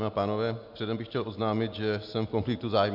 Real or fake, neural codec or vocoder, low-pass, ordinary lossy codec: real; none; 5.4 kHz; MP3, 48 kbps